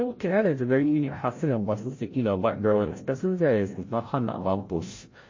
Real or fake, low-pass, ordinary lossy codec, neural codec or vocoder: fake; 7.2 kHz; MP3, 32 kbps; codec, 16 kHz, 0.5 kbps, FreqCodec, larger model